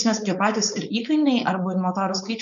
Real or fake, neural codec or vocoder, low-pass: fake; codec, 16 kHz, 4.8 kbps, FACodec; 7.2 kHz